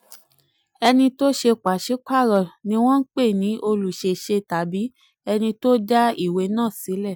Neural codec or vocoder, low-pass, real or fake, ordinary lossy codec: none; none; real; none